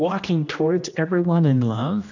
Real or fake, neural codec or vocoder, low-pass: fake; codec, 16 kHz, 1 kbps, X-Codec, HuBERT features, trained on general audio; 7.2 kHz